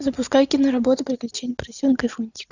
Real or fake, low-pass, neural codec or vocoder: real; 7.2 kHz; none